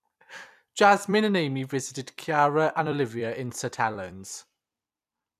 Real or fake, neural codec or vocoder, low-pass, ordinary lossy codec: fake; vocoder, 44.1 kHz, 128 mel bands every 256 samples, BigVGAN v2; 14.4 kHz; none